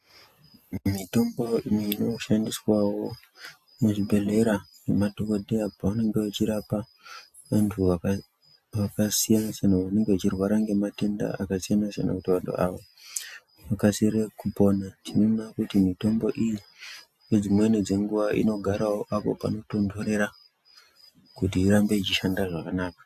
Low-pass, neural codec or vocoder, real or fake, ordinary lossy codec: 14.4 kHz; none; real; AAC, 96 kbps